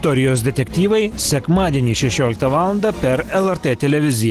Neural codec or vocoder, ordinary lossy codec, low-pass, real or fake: none; Opus, 16 kbps; 14.4 kHz; real